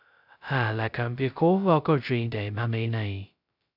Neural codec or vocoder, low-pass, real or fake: codec, 16 kHz, 0.2 kbps, FocalCodec; 5.4 kHz; fake